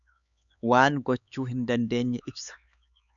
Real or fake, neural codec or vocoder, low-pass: fake; codec, 16 kHz, 4 kbps, X-Codec, HuBERT features, trained on LibriSpeech; 7.2 kHz